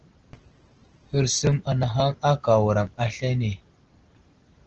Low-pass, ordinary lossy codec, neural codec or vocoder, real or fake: 7.2 kHz; Opus, 16 kbps; none; real